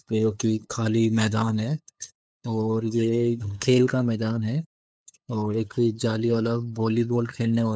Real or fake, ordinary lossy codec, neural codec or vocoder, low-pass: fake; none; codec, 16 kHz, 8 kbps, FunCodec, trained on LibriTTS, 25 frames a second; none